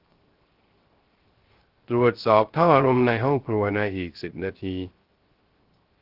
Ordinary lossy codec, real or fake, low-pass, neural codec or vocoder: Opus, 16 kbps; fake; 5.4 kHz; codec, 16 kHz, 0.3 kbps, FocalCodec